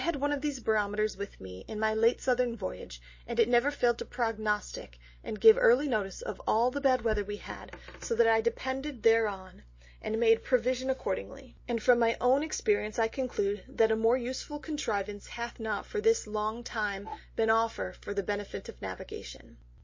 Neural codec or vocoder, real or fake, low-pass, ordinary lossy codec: none; real; 7.2 kHz; MP3, 32 kbps